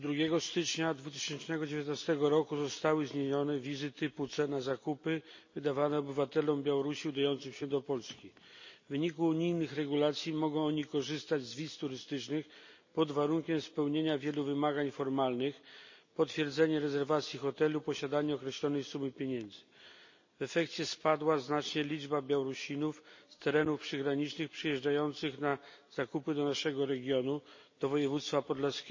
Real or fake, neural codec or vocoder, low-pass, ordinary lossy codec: real; none; 7.2 kHz; MP3, 32 kbps